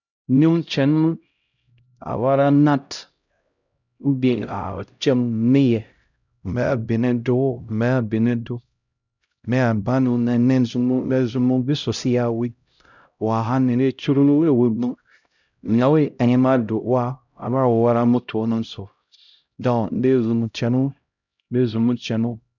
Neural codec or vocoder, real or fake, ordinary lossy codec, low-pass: codec, 16 kHz, 0.5 kbps, X-Codec, HuBERT features, trained on LibriSpeech; fake; none; 7.2 kHz